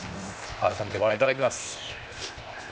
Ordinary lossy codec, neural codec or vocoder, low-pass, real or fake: none; codec, 16 kHz, 0.8 kbps, ZipCodec; none; fake